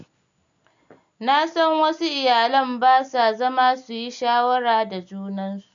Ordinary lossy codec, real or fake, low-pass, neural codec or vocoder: none; real; 7.2 kHz; none